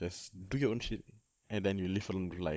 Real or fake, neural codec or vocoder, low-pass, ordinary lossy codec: fake; codec, 16 kHz, 16 kbps, FunCodec, trained on Chinese and English, 50 frames a second; none; none